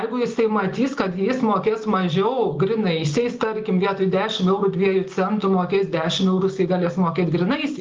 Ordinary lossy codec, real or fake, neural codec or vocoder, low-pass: Opus, 32 kbps; real; none; 7.2 kHz